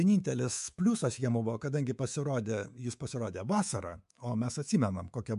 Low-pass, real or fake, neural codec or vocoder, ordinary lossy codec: 10.8 kHz; fake; codec, 24 kHz, 3.1 kbps, DualCodec; MP3, 64 kbps